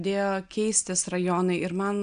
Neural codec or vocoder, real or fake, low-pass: none; real; 9.9 kHz